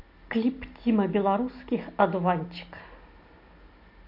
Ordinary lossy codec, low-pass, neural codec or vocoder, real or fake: MP3, 32 kbps; 5.4 kHz; none; real